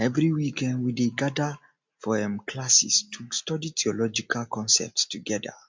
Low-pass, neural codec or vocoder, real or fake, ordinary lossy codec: 7.2 kHz; none; real; MP3, 64 kbps